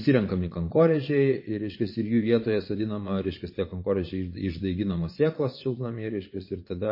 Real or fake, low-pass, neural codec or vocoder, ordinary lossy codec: fake; 5.4 kHz; vocoder, 22.05 kHz, 80 mel bands, Vocos; MP3, 24 kbps